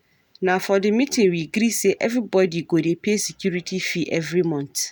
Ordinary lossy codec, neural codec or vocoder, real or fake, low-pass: none; none; real; none